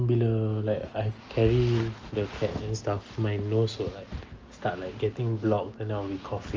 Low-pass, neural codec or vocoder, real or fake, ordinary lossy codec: 7.2 kHz; none; real; Opus, 24 kbps